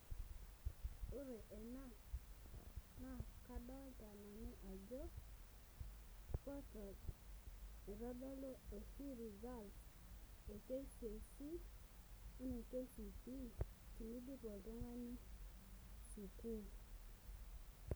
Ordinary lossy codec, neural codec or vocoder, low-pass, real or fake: none; none; none; real